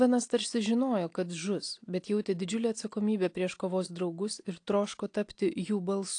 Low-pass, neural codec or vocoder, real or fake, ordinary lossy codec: 9.9 kHz; none; real; AAC, 48 kbps